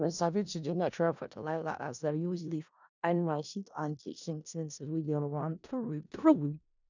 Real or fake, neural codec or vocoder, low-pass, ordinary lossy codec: fake; codec, 16 kHz in and 24 kHz out, 0.4 kbps, LongCat-Audio-Codec, four codebook decoder; 7.2 kHz; none